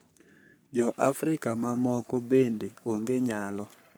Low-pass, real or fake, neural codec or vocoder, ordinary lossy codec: none; fake; codec, 44.1 kHz, 3.4 kbps, Pupu-Codec; none